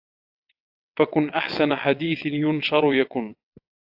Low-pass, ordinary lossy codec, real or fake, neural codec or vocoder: 5.4 kHz; AAC, 48 kbps; fake; vocoder, 22.05 kHz, 80 mel bands, WaveNeXt